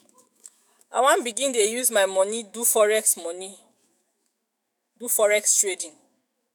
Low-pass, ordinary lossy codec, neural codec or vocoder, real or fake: none; none; autoencoder, 48 kHz, 128 numbers a frame, DAC-VAE, trained on Japanese speech; fake